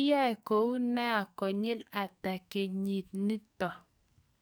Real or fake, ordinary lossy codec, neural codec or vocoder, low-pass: fake; none; codec, 44.1 kHz, 2.6 kbps, SNAC; none